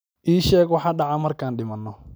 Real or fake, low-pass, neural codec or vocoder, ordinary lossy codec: real; none; none; none